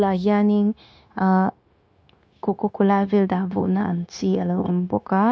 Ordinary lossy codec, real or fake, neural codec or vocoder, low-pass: none; fake; codec, 16 kHz, 0.9 kbps, LongCat-Audio-Codec; none